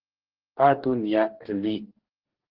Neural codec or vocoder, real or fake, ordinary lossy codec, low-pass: codec, 44.1 kHz, 2.6 kbps, DAC; fake; Opus, 16 kbps; 5.4 kHz